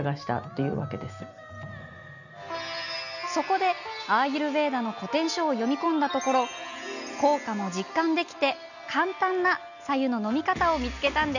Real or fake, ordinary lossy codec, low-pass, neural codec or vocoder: real; none; 7.2 kHz; none